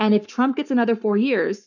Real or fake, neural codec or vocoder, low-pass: fake; codec, 44.1 kHz, 7.8 kbps, Pupu-Codec; 7.2 kHz